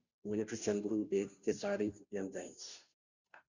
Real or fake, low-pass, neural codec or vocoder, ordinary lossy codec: fake; 7.2 kHz; codec, 16 kHz, 0.5 kbps, FunCodec, trained on Chinese and English, 25 frames a second; Opus, 64 kbps